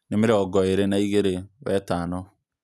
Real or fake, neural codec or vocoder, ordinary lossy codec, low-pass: real; none; none; none